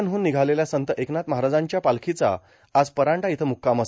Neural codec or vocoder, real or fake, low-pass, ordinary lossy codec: none; real; none; none